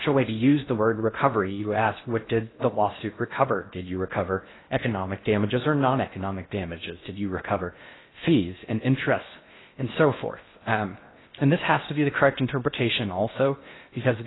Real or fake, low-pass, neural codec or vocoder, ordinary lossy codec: fake; 7.2 kHz; codec, 16 kHz in and 24 kHz out, 0.6 kbps, FocalCodec, streaming, 4096 codes; AAC, 16 kbps